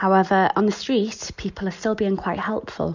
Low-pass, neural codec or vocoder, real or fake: 7.2 kHz; none; real